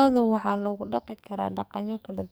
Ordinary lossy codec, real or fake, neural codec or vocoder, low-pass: none; fake; codec, 44.1 kHz, 2.6 kbps, SNAC; none